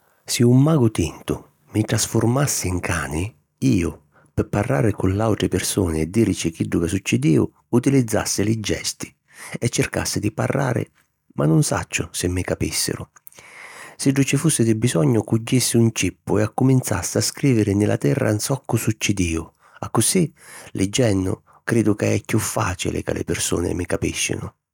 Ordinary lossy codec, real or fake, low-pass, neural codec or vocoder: none; real; 19.8 kHz; none